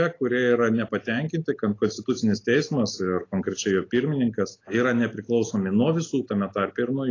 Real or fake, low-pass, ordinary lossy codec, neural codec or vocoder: real; 7.2 kHz; AAC, 32 kbps; none